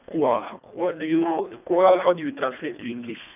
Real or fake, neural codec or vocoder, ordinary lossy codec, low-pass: fake; codec, 24 kHz, 1.5 kbps, HILCodec; none; 3.6 kHz